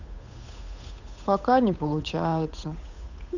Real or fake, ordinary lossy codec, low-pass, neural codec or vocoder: fake; none; 7.2 kHz; codec, 16 kHz, 8 kbps, FunCodec, trained on Chinese and English, 25 frames a second